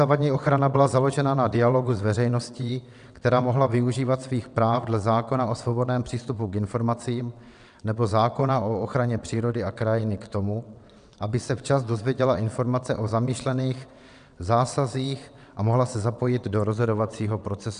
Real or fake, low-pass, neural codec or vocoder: fake; 9.9 kHz; vocoder, 22.05 kHz, 80 mel bands, WaveNeXt